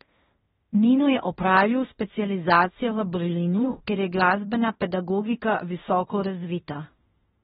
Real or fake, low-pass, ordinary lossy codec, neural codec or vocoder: fake; 10.8 kHz; AAC, 16 kbps; codec, 16 kHz in and 24 kHz out, 0.9 kbps, LongCat-Audio-Codec, fine tuned four codebook decoder